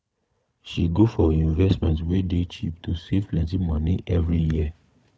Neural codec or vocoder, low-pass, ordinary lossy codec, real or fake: codec, 16 kHz, 4 kbps, FunCodec, trained on Chinese and English, 50 frames a second; none; none; fake